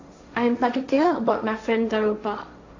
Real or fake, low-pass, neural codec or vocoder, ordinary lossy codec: fake; 7.2 kHz; codec, 16 kHz, 1.1 kbps, Voila-Tokenizer; AAC, 48 kbps